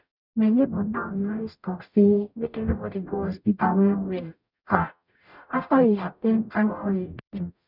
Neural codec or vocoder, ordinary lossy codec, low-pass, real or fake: codec, 44.1 kHz, 0.9 kbps, DAC; none; 5.4 kHz; fake